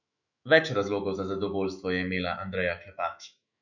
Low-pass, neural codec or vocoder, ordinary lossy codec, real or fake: 7.2 kHz; autoencoder, 48 kHz, 128 numbers a frame, DAC-VAE, trained on Japanese speech; none; fake